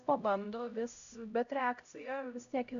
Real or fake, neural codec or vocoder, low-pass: fake; codec, 16 kHz, 0.5 kbps, X-Codec, HuBERT features, trained on LibriSpeech; 7.2 kHz